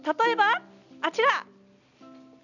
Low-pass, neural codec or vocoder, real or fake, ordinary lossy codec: 7.2 kHz; none; real; none